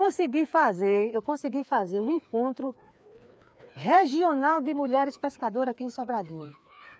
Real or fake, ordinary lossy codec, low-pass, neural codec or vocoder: fake; none; none; codec, 16 kHz, 2 kbps, FreqCodec, larger model